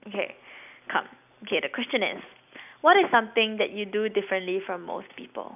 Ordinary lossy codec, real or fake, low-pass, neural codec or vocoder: none; real; 3.6 kHz; none